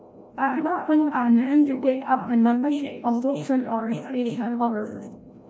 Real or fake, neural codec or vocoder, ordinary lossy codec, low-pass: fake; codec, 16 kHz, 0.5 kbps, FreqCodec, larger model; none; none